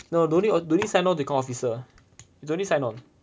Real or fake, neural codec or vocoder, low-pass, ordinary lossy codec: real; none; none; none